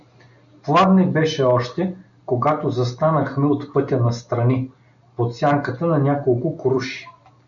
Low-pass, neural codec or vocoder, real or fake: 7.2 kHz; none; real